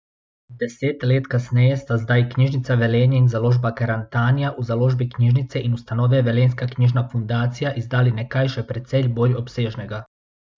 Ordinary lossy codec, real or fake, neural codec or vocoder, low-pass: none; real; none; none